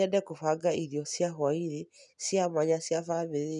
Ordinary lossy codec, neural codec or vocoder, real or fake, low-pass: none; none; real; 10.8 kHz